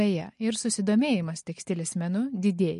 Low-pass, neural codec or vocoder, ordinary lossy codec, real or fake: 10.8 kHz; none; MP3, 48 kbps; real